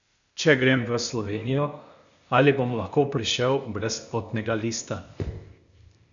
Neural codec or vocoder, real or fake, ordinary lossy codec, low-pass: codec, 16 kHz, 0.8 kbps, ZipCodec; fake; none; 7.2 kHz